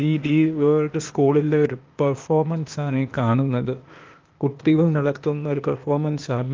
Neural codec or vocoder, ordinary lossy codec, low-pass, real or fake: codec, 16 kHz, 0.8 kbps, ZipCodec; Opus, 24 kbps; 7.2 kHz; fake